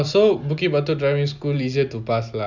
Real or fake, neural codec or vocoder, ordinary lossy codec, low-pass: real; none; none; 7.2 kHz